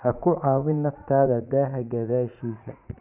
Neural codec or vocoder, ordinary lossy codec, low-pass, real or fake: vocoder, 44.1 kHz, 80 mel bands, Vocos; none; 3.6 kHz; fake